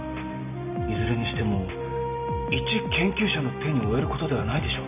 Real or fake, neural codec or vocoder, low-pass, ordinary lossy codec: real; none; 3.6 kHz; none